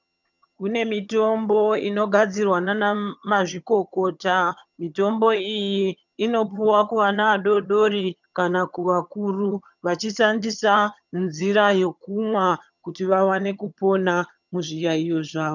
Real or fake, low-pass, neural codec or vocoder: fake; 7.2 kHz; vocoder, 22.05 kHz, 80 mel bands, HiFi-GAN